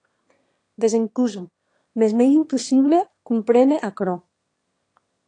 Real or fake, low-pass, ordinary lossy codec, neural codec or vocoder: fake; 9.9 kHz; AAC, 48 kbps; autoencoder, 22.05 kHz, a latent of 192 numbers a frame, VITS, trained on one speaker